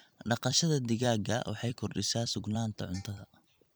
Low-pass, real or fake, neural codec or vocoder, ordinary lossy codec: none; fake; vocoder, 44.1 kHz, 128 mel bands every 256 samples, BigVGAN v2; none